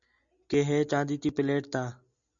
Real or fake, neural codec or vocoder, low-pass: real; none; 7.2 kHz